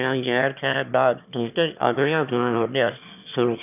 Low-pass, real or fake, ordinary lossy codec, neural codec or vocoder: 3.6 kHz; fake; none; autoencoder, 22.05 kHz, a latent of 192 numbers a frame, VITS, trained on one speaker